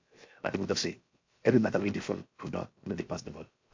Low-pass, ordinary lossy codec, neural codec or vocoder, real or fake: 7.2 kHz; MP3, 48 kbps; codec, 16 kHz, 0.7 kbps, FocalCodec; fake